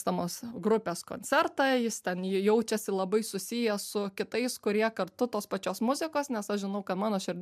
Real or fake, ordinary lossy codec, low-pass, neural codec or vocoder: real; MP3, 96 kbps; 14.4 kHz; none